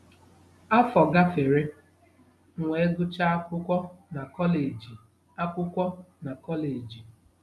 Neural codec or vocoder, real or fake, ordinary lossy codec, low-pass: none; real; none; none